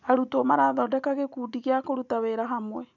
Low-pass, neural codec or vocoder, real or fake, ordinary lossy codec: 7.2 kHz; none; real; none